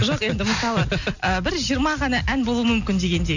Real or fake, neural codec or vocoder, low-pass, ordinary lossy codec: real; none; 7.2 kHz; none